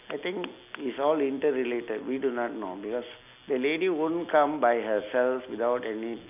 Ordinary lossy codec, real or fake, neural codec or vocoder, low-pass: none; real; none; 3.6 kHz